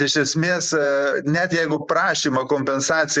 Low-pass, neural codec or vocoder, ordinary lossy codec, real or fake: 10.8 kHz; vocoder, 44.1 kHz, 128 mel bands every 512 samples, BigVGAN v2; Opus, 64 kbps; fake